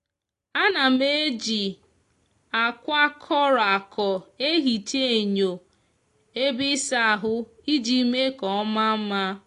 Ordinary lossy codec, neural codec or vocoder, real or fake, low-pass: AAC, 48 kbps; none; real; 10.8 kHz